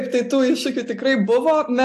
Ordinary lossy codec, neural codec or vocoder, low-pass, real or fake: AAC, 64 kbps; none; 14.4 kHz; real